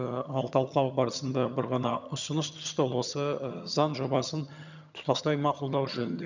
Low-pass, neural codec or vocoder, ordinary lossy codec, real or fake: 7.2 kHz; vocoder, 22.05 kHz, 80 mel bands, HiFi-GAN; none; fake